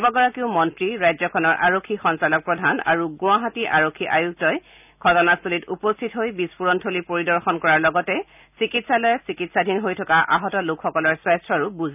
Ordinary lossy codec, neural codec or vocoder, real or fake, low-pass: none; none; real; 3.6 kHz